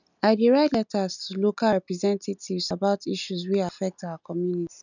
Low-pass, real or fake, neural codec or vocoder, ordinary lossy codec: 7.2 kHz; real; none; none